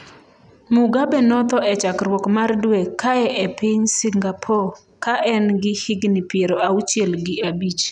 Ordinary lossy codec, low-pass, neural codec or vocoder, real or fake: none; 10.8 kHz; none; real